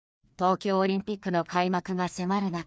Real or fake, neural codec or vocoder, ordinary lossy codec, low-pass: fake; codec, 16 kHz, 2 kbps, FreqCodec, larger model; none; none